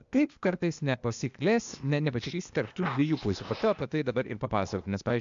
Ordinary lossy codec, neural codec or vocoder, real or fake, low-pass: MP3, 64 kbps; codec, 16 kHz, 0.8 kbps, ZipCodec; fake; 7.2 kHz